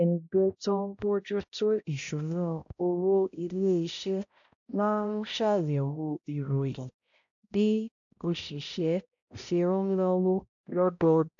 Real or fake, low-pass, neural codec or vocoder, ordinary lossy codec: fake; 7.2 kHz; codec, 16 kHz, 0.5 kbps, X-Codec, HuBERT features, trained on balanced general audio; AAC, 64 kbps